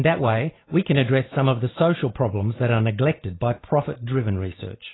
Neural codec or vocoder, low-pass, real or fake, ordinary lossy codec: none; 7.2 kHz; real; AAC, 16 kbps